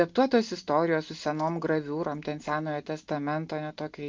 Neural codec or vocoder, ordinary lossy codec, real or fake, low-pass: none; Opus, 32 kbps; real; 7.2 kHz